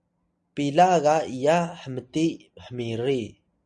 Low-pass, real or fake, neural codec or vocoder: 9.9 kHz; real; none